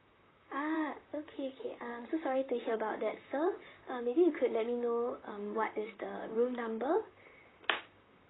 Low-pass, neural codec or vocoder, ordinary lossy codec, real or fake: 7.2 kHz; vocoder, 44.1 kHz, 128 mel bands, Pupu-Vocoder; AAC, 16 kbps; fake